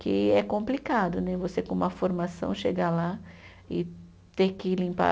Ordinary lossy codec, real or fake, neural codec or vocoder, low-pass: none; real; none; none